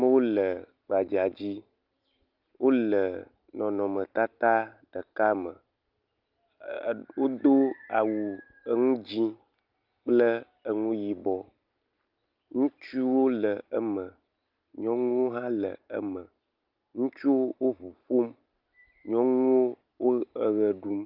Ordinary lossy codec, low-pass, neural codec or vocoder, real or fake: Opus, 24 kbps; 5.4 kHz; none; real